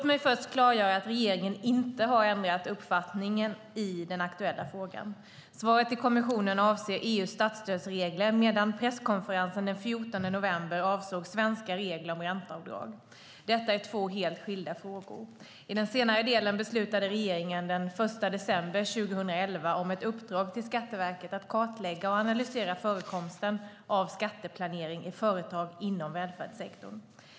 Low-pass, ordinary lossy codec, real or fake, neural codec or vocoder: none; none; real; none